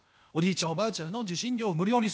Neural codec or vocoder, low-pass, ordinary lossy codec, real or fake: codec, 16 kHz, 0.8 kbps, ZipCodec; none; none; fake